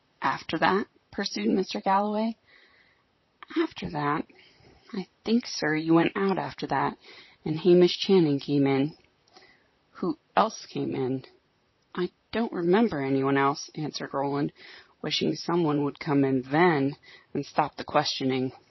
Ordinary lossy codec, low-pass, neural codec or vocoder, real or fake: MP3, 24 kbps; 7.2 kHz; vocoder, 44.1 kHz, 128 mel bands, Pupu-Vocoder; fake